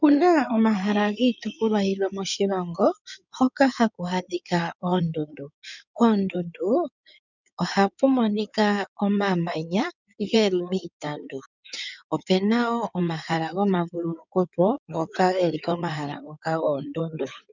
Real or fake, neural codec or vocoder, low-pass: fake; codec, 16 kHz in and 24 kHz out, 2.2 kbps, FireRedTTS-2 codec; 7.2 kHz